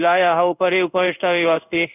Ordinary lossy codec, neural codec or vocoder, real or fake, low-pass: none; codec, 16 kHz in and 24 kHz out, 1 kbps, XY-Tokenizer; fake; 3.6 kHz